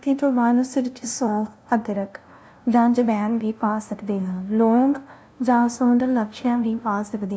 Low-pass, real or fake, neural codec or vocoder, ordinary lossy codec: none; fake; codec, 16 kHz, 0.5 kbps, FunCodec, trained on LibriTTS, 25 frames a second; none